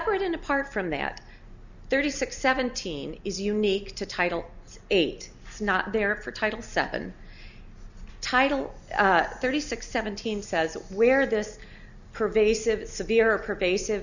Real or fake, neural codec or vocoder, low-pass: real; none; 7.2 kHz